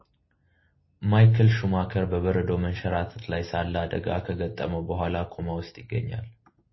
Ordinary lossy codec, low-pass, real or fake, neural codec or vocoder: MP3, 24 kbps; 7.2 kHz; real; none